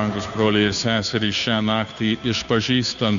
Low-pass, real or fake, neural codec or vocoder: 7.2 kHz; fake; codec, 16 kHz, 2 kbps, FunCodec, trained on Chinese and English, 25 frames a second